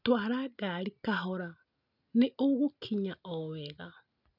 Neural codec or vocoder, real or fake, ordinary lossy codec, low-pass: none; real; none; 5.4 kHz